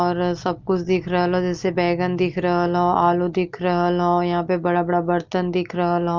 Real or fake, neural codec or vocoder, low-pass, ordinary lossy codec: real; none; 7.2 kHz; Opus, 32 kbps